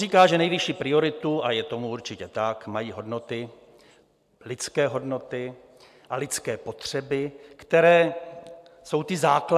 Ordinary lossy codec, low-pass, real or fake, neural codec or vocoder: AAC, 96 kbps; 14.4 kHz; real; none